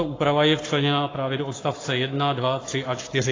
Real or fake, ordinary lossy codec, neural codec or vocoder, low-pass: fake; AAC, 32 kbps; codec, 44.1 kHz, 7.8 kbps, Pupu-Codec; 7.2 kHz